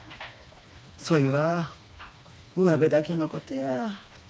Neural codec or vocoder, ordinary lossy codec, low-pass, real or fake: codec, 16 kHz, 2 kbps, FreqCodec, smaller model; none; none; fake